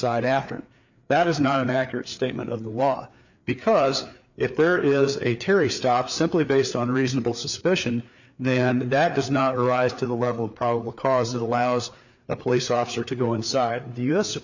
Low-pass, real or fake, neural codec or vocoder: 7.2 kHz; fake; codec, 16 kHz, 4 kbps, FreqCodec, larger model